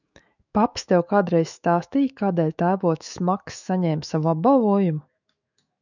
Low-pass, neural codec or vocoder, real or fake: 7.2 kHz; autoencoder, 48 kHz, 128 numbers a frame, DAC-VAE, trained on Japanese speech; fake